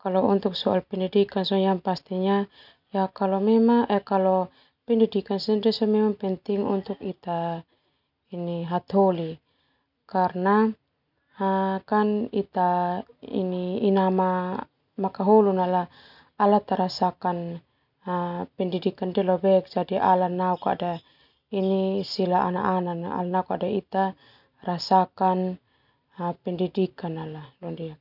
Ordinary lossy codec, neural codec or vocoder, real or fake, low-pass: none; none; real; 5.4 kHz